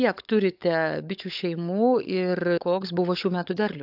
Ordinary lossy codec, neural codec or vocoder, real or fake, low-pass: AAC, 48 kbps; codec, 16 kHz, 16 kbps, FunCodec, trained on LibriTTS, 50 frames a second; fake; 5.4 kHz